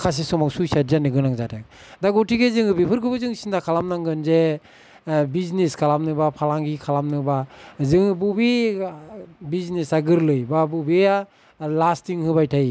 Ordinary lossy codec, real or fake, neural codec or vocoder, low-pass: none; real; none; none